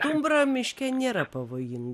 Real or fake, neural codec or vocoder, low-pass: real; none; 14.4 kHz